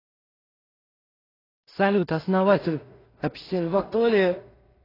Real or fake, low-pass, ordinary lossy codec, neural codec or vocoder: fake; 5.4 kHz; AAC, 24 kbps; codec, 16 kHz in and 24 kHz out, 0.4 kbps, LongCat-Audio-Codec, two codebook decoder